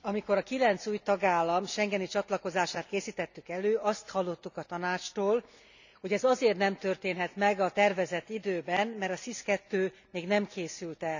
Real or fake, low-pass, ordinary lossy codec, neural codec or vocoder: real; 7.2 kHz; none; none